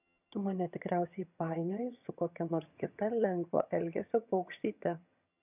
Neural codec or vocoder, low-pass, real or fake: vocoder, 22.05 kHz, 80 mel bands, HiFi-GAN; 3.6 kHz; fake